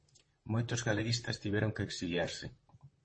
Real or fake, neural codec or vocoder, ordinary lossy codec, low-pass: fake; vocoder, 44.1 kHz, 128 mel bands, Pupu-Vocoder; MP3, 32 kbps; 9.9 kHz